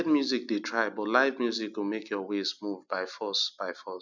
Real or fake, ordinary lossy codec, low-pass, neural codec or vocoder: real; none; 7.2 kHz; none